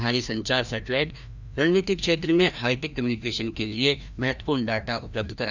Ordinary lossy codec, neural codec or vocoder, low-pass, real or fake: none; codec, 16 kHz, 1 kbps, FreqCodec, larger model; 7.2 kHz; fake